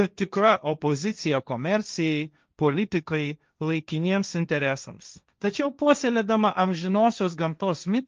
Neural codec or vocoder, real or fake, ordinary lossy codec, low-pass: codec, 16 kHz, 1.1 kbps, Voila-Tokenizer; fake; Opus, 24 kbps; 7.2 kHz